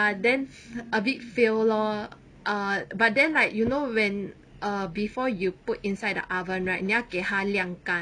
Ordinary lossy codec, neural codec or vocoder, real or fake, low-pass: MP3, 96 kbps; none; real; 9.9 kHz